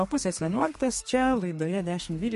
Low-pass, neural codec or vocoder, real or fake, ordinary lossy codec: 14.4 kHz; codec, 32 kHz, 1.9 kbps, SNAC; fake; MP3, 48 kbps